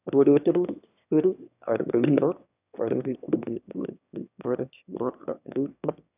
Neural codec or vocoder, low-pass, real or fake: autoencoder, 22.05 kHz, a latent of 192 numbers a frame, VITS, trained on one speaker; 3.6 kHz; fake